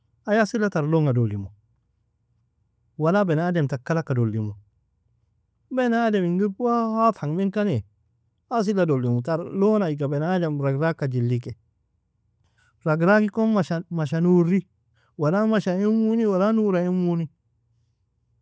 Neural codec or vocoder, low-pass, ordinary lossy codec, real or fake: none; none; none; real